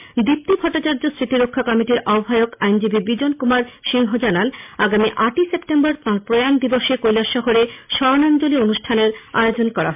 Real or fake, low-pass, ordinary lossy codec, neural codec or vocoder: real; 3.6 kHz; MP3, 32 kbps; none